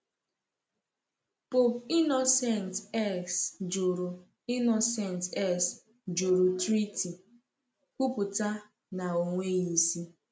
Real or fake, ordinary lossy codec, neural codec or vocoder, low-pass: real; none; none; none